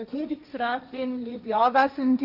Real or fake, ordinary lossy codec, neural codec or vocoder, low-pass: fake; none; codec, 16 kHz, 1.1 kbps, Voila-Tokenizer; 5.4 kHz